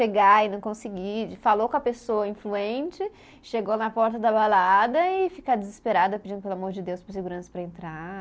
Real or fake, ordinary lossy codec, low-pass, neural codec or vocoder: real; none; none; none